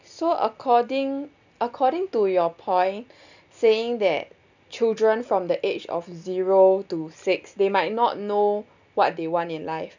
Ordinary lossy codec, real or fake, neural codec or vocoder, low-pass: none; real; none; 7.2 kHz